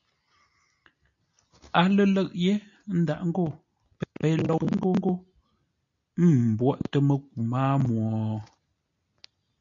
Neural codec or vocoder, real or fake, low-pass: none; real; 7.2 kHz